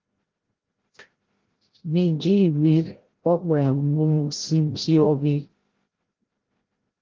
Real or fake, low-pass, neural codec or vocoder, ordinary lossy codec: fake; 7.2 kHz; codec, 16 kHz, 0.5 kbps, FreqCodec, larger model; Opus, 16 kbps